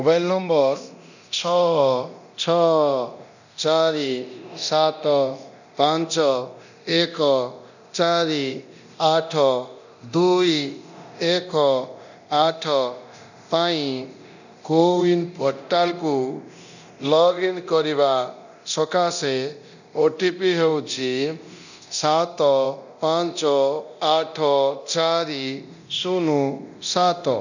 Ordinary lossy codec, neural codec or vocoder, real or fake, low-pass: none; codec, 24 kHz, 0.9 kbps, DualCodec; fake; 7.2 kHz